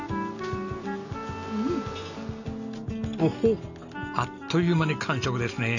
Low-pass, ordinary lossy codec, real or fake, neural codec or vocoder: 7.2 kHz; none; real; none